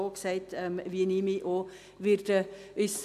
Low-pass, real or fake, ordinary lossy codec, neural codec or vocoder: 14.4 kHz; real; AAC, 96 kbps; none